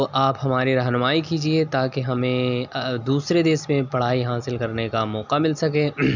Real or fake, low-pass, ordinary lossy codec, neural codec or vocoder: real; 7.2 kHz; none; none